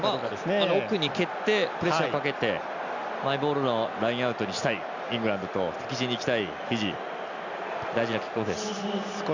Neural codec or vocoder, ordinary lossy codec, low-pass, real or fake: none; Opus, 64 kbps; 7.2 kHz; real